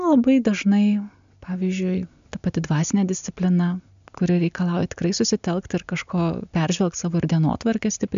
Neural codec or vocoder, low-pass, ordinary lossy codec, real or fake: none; 7.2 kHz; MP3, 64 kbps; real